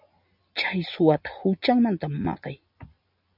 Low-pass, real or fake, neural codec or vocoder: 5.4 kHz; real; none